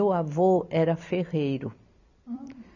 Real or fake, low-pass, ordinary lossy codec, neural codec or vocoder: fake; 7.2 kHz; none; vocoder, 44.1 kHz, 128 mel bands every 256 samples, BigVGAN v2